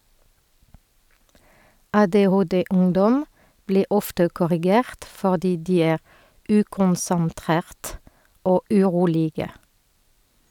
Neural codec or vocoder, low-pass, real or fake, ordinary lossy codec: none; 19.8 kHz; real; none